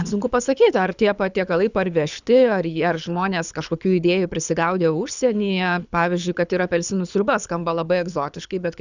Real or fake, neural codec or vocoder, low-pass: fake; codec, 24 kHz, 6 kbps, HILCodec; 7.2 kHz